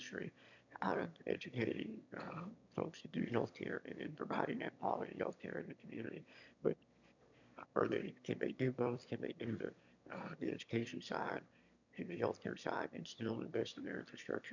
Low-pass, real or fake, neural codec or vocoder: 7.2 kHz; fake; autoencoder, 22.05 kHz, a latent of 192 numbers a frame, VITS, trained on one speaker